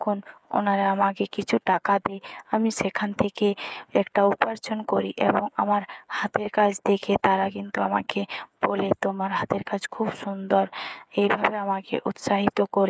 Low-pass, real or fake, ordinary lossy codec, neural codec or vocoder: none; fake; none; codec, 16 kHz, 8 kbps, FreqCodec, smaller model